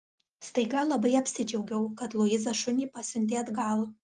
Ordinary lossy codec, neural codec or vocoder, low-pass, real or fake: Opus, 32 kbps; none; 10.8 kHz; real